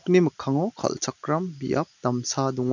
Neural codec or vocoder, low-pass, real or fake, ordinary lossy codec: none; 7.2 kHz; real; none